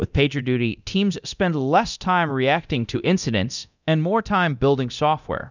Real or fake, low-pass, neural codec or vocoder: fake; 7.2 kHz; codec, 24 kHz, 0.9 kbps, DualCodec